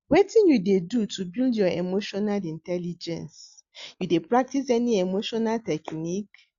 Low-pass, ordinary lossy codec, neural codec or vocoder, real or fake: 7.2 kHz; Opus, 64 kbps; none; real